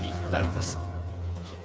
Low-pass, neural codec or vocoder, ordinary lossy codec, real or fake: none; codec, 16 kHz, 4 kbps, FreqCodec, smaller model; none; fake